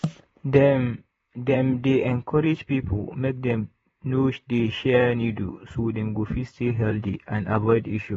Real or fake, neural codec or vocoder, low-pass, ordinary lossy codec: fake; vocoder, 44.1 kHz, 128 mel bands, Pupu-Vocoder; 19.8 kHz; AAC, 24 kbps